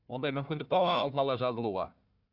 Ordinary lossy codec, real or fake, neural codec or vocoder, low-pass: none; fake; codec, 16 kHz, 1 kbps, FunCodec, trained on Chinese and English, 50 frames a second; 5.4 kHz